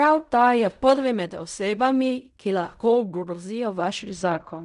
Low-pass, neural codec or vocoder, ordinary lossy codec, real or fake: 10.8 kHz; codec, 16 kHz in and 24 kHz out, 0.4 kbps, LongCat-Audio-Codec, fine tuned four codebook decoder; none; fake